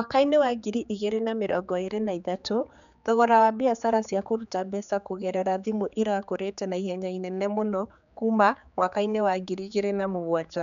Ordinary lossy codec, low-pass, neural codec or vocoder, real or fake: none; 7.2 kHz; codec, 16 kHz, 4 kbps, X-Codec, HuBERT features, trained on general audio; fake